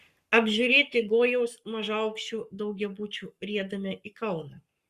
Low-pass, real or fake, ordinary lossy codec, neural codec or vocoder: 14.4 kHz; fake; Opus, 64 kbps; codec, 44.1 kHz, 7.8 kbps, Pupu-Codec